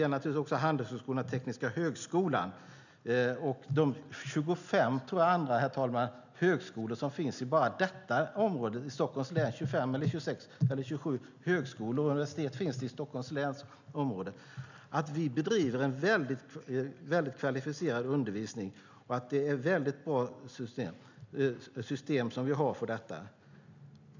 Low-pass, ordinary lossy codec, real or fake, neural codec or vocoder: 7.2 kHz; none; real; none